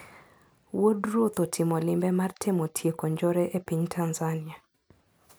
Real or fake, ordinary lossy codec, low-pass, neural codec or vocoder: real; none; none; none